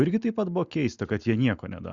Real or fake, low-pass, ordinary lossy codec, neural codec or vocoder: real; 7.2 kHz; Opus, 64 kbps; none